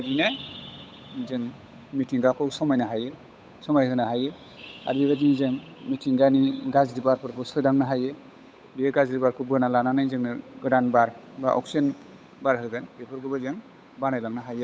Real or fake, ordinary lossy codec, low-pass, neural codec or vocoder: fake; none; none; codec, 16 kHz, 8 kbps, FunCodec, trained on Chinese and English, 25 frames a second